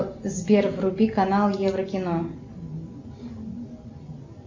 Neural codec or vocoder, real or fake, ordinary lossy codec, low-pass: none; real; MP3, 48 kbps; 7.2 kHz